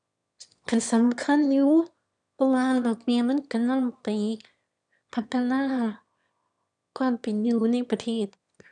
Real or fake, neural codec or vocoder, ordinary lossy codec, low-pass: fake; autoencoder, 22.05 kHz, a latent of 192 numbers a frame, VITS, trained on one speaker; none; 9.9 kHz